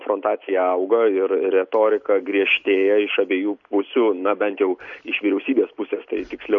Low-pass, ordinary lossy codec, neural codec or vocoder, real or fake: 7.2 kHz; MP3, 48 kbps; none; real